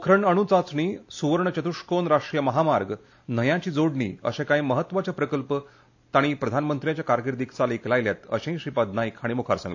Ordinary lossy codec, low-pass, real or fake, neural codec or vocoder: AAC, 48 kbps; 7.2 kHz; real; none